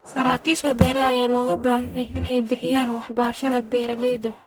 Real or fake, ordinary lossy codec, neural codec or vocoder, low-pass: fake; none; codec, 44.1 kHz, 0.9 kbps, DAC; none